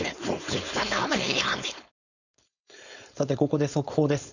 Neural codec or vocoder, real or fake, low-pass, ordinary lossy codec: codec, 16 kHz, 4.8 kbps, FACodec; fake; 7.2 kHz; none